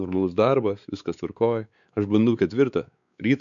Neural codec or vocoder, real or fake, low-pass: codec, 16 kHz, 4 kbps, X-Codec, WavLM features, trained on Multilingual LibriSpeech; fake; 7.2 kHz